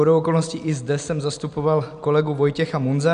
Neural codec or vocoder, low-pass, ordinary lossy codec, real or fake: none; 9.9 kHz; MP3, 96 kbps; real